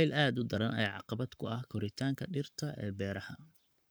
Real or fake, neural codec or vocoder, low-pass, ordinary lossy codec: fake; codec, 44.1 kHz, 7.8 kbps, Pupu-Codec; none; none